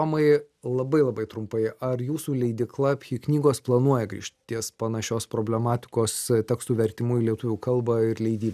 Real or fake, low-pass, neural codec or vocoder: real; 14.4 kHz; none